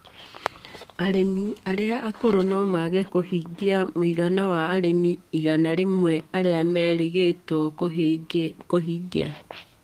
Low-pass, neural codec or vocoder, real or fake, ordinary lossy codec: 14.4 kHz; codec, 32 kHz, 1.9 kbps, SNAC; fake; Opus, 32 kbps